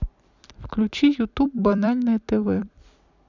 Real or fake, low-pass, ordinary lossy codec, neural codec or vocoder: fake; 7.2 kHz; none; vocoder, 44.1 kHz, 128 mel bands every 256 samples, BigVGAN v2